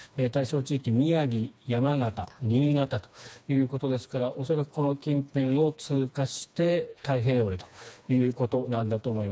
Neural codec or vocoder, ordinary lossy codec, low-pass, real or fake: codec, 16 kHz, 2 kbps, FreqCodec, smaller model; none; none; fake